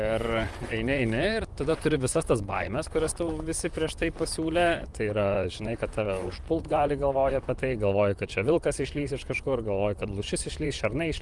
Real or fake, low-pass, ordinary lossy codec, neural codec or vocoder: fake; 10.8 kHz; Opus, 32 kbps; vocoder, 44.1 kHz, 128 mel bands, Pupu-Vocoder